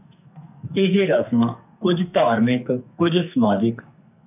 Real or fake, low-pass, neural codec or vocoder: fake; 3.6 kHz; codec, 44.1 kHz, 2.6 kbps, SNAC